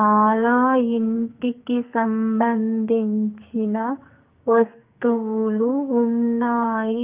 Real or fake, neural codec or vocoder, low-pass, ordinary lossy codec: fake; codec, 44.1 kHz, 2.6 kbps, SNAC; 3.6 kHz; Opus, 32 kbps